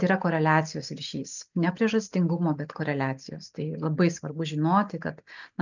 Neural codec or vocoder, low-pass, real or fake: none; 7.2 kHz; real